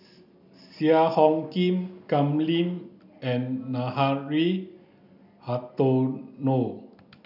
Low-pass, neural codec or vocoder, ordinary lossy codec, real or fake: 5.4 kHz; none; none; real